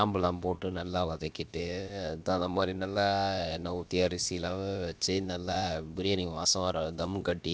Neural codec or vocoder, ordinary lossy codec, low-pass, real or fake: codec, 16 kHz, about 1 kbps, DyCAST, with the encoder's durations; none; none; fake